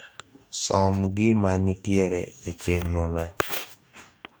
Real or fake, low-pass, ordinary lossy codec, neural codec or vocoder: fake; none; none; codec, 44.1 kHz, 2.6 kbps, DAC